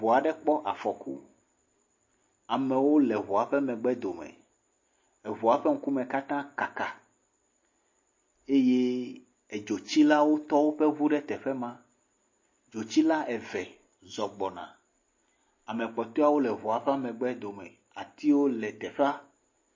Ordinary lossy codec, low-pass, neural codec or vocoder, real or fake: MP3, 32 kbps; 7.2 kHz; none; real